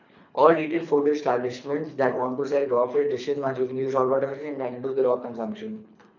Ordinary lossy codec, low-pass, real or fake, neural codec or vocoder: none; 7.2 kHz; fake; codec, 24 kHz, 3 kbps, HILCodec